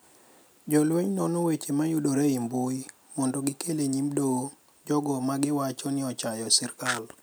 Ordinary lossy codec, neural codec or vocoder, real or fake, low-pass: none; none; real; none